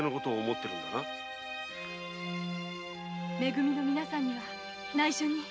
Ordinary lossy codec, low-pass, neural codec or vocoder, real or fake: none; none; none; real